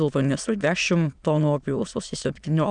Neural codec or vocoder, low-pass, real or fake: autoencoder, 22.05 kHz, a latent of 192 numbers a frame, VITS, trained on many speakers; 9.9 kHz; fake